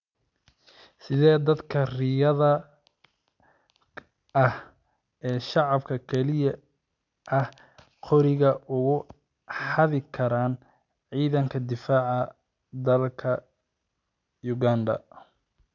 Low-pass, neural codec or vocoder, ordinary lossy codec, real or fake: 7.2 kHz; none; none; real